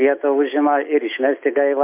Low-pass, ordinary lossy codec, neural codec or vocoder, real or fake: 3.6 kHz; MP3, 32 kbps; none; real